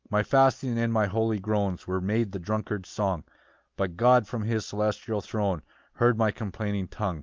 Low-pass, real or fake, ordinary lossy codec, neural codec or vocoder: 7.2 kHz; real; Opus, 32 kbps; none